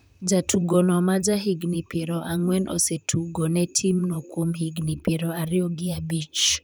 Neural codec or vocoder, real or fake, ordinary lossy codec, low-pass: vocoder, 44.1 kHz, 128 mel bands, Pupu-Vocoder; fake; none; none